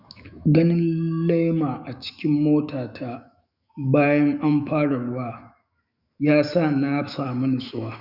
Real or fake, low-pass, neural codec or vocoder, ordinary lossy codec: fake; 5.4 kHz; autoencoder, 48 kHz, 128 numbers a frame, DAC-VAE, trained on Japanese speech; Opus, 64 kbps